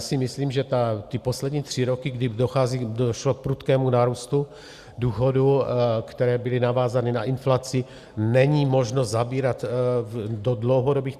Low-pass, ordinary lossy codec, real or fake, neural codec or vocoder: 14.4 kHz; Opus, 64 kbps; real; none